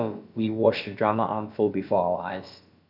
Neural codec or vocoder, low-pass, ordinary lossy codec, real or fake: codec, 16 kHz, about 1 kbps, DyCAST, with the encoder's durations; 5.4 kHz; none; fake